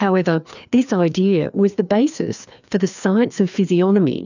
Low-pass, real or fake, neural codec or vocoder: 7.2 kHz; fake; codec, 16 kHz, 2 kbps, FreqCodec, larger model